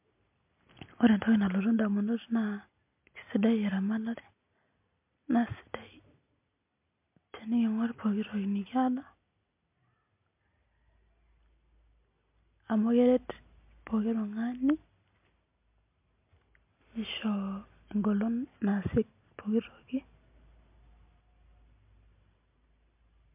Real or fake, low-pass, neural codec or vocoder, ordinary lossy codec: real; 3.6 kHz; none; MP3, 32 kbps